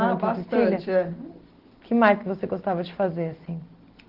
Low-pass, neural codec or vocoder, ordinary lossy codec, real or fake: 5.4 kHz; none; Opus, 16 kbps; real